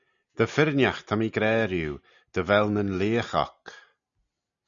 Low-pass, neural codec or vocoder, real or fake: 7.2 kHz; none; real